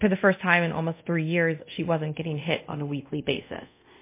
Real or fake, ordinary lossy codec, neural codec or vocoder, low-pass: fake; MP3, 24 kbps; codec, 24 kHz, 0.5 kbps, DualCodec; 3.6 kHz